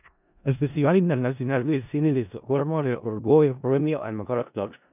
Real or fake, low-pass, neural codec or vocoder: fake; 3.6 kHz; codec, 16 kHz in and 24 kHz out, 0.4 kbps, LongCat-Audio-Codec, four codebook decoder